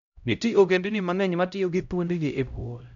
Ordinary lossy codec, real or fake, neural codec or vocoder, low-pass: none; fake; codec, 16 kHz, 0.5 kbps, X-Codec, HuBERT features, trained on LibriSpeech; 7.2 kHz